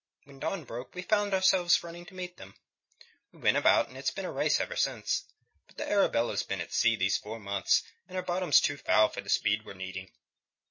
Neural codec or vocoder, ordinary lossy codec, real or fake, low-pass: none; MP3, 32 kbps; real; 7.2 kHz